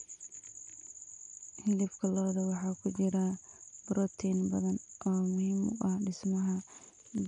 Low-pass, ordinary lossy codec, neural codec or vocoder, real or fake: 9.9 kHz; none; none; real